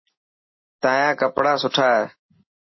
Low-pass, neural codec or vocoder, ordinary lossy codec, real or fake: 7.2 kHz; none; MP3, 24 kbps; real